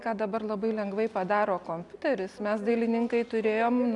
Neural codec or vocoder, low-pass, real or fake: none; 10.8 kHz; real